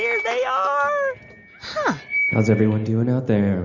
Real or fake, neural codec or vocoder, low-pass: real; none; 7.2 kHz